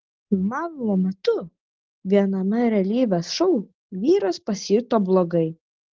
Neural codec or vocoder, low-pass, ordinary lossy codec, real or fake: none; 7.2 kHz; Opus, 16 kbps; real